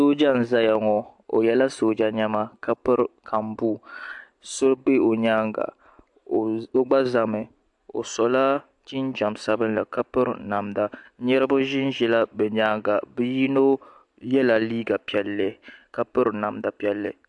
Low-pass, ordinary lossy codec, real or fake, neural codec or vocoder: 10.8 kHz; AAC, 64 kbps; real; none